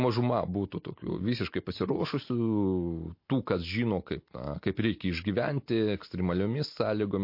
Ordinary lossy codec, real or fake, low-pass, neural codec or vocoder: MP3, 32 kbps; real; 5.4 kHz; none